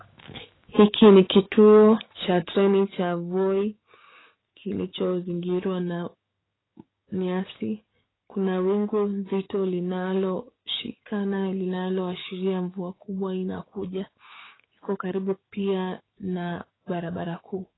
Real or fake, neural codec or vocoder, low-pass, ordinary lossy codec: fake; codec, 16 kHz, 6 kbps, DAC; 7.2 kHz; AAC, 16 kbps